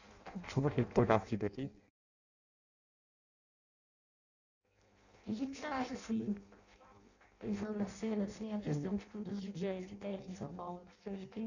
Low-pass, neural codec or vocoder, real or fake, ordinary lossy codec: 7.2 kHz; codec, 16 kHz in and 24 kHz out, 0.6 kbps, FireRedTTS-2 codec; fake; none